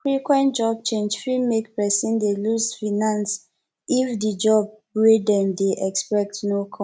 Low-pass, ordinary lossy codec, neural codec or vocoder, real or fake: none; none; none; real